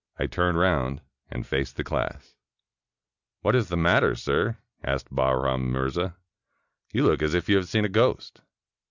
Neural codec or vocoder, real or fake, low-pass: none; real; 7.2 kHz